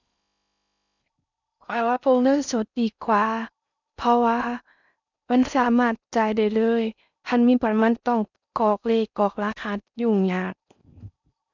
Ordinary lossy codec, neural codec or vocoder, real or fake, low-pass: none; codec, 16 kHz in and 24 kHz out, 0.6 kbps, FocalCodec, streaming, 4096 codes; fake; 7.2 kHz